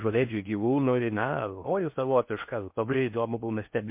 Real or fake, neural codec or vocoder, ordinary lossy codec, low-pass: fake; codec, 16 kHz in and 24 kHz out, 0.6 kbps, FocalCodec, streaming, 4096 codes; MP3, 32 kbps; 3.6 kHz